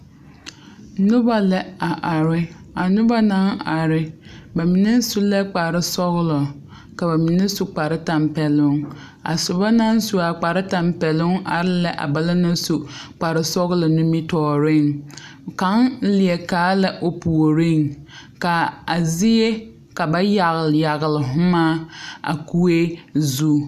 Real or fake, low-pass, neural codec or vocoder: real; 14.4 kHz; none